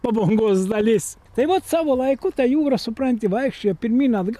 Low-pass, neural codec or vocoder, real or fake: 14.4 kHz; none; real